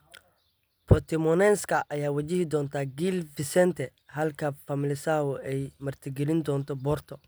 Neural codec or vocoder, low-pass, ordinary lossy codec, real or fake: none; none; none; real